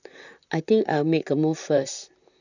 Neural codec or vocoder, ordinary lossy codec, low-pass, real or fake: vocoder, 44.1 kHz, 128 mel bands, Pupu-Vocoder; none; 7.2 kHz; fake